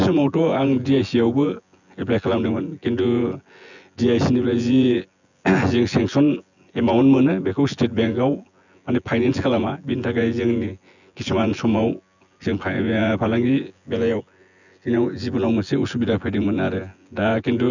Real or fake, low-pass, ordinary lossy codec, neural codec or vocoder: fake; 7.2 kHz; none; vocoder, 24 kHz, 100 mel bands, Vocos